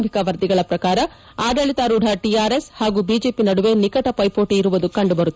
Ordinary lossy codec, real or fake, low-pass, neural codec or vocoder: none; real; none; none